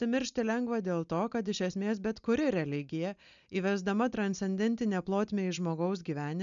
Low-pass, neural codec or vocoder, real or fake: 7.2 kHz; none; real